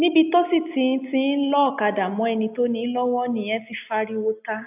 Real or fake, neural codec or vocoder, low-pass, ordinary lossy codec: real; none; 3.6 kHz; AAC, 32 kbps